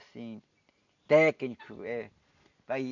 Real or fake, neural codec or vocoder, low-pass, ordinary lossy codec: real; none; 7.2 kHz; none